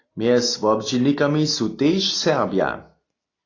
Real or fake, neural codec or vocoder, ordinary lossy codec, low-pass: real; none; AAC, 32 kbps; 7.2 kHz